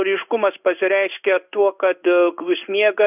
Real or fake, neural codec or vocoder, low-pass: fake; codec, 16 kHz in and 24 kHz out, 1 kbps, XY-Tokenizer; 3.6 kHz